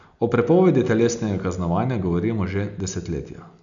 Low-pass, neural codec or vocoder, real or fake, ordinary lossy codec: 7.2 kHz; none; real; none